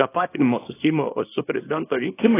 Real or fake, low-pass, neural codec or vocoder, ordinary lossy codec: fake; 3.6 kHz; codec, 24 kHz, 0.9 kbps, WavTokenizer, small release; AAC, 16 kbps